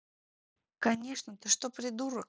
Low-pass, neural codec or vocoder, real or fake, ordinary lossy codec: none; none; real; none